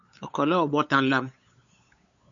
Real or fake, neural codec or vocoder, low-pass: fake; codec, 16 kHz, 8 kbps, FunCodec, trained on LibriTTS, 25 frames a second; 7.2 kHz